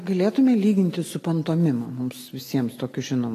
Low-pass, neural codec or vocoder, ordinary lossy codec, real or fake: 14.4 kHz; none; AAC, 48 kbps; real